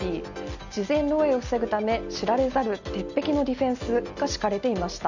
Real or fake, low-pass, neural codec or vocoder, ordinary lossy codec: real; 7.2 kHz; none; none